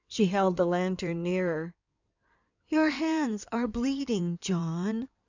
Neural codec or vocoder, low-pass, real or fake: codec, 16 kHz in and 24 kHz out, 2.2 kbps, FireRedTTS-2 codec; 7.2 kHz; fake